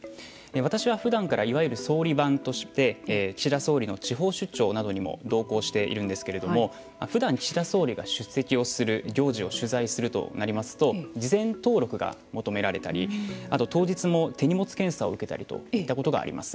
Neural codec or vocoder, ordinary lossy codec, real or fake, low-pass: none; none; real; none